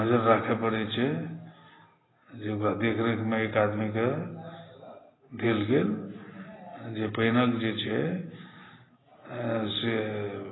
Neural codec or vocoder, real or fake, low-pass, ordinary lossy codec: none; real; 7.2 kHz; AAC, 16 kbps